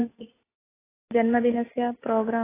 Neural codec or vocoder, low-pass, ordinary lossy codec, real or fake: none; 3.6 kHz; AAC, 16 kbps; real